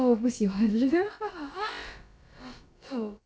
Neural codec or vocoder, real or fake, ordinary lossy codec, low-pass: codec, 16 kHz, about 1 kbps, DyCAST, with the encoder's durations; fake; none; none